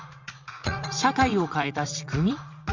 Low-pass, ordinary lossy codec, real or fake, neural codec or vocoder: 7.2 kHz; Opus, 64 kbps; fake; vocoder, 44.1 kHz, 80 mel bands, Vocos